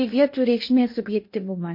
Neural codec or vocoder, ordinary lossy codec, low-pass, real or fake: codec, 16 kHz in and 24 kHz out, 0.8 kbps, FocalCodec, streaming, 65536 codes; MP3, 32 kbps; 5.4 kHz; fake